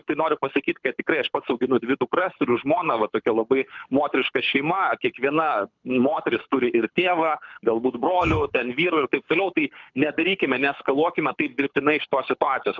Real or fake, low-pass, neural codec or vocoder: fake; 7.2 kHz; codec, 24 kHz, 6 kbps, HILCodec